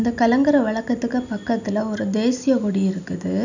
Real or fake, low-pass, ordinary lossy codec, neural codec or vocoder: real; 7.2 kHz; none; none